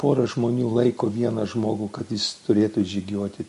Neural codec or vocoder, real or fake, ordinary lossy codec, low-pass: none; real; MP3, 48 kbps; 14.4 kHz